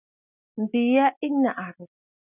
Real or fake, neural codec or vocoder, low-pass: real; none; 3.6 kHz